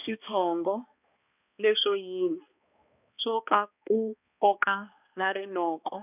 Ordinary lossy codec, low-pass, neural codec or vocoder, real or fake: none; 3.6 kHz; codec, 16 kHz, 2 kbps, X-Codec, HuBERT features, trained on balanced general audio; fake